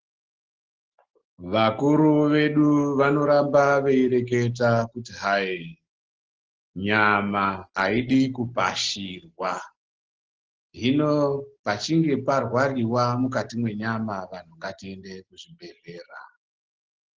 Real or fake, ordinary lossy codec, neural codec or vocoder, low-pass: real; Opus, 16 kbps; none; 7.2 kHz